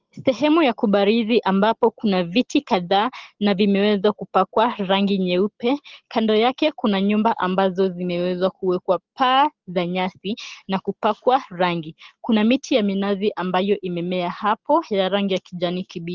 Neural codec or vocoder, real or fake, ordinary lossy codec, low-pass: none; real; Opus, 16 kbps; 7.2 kHz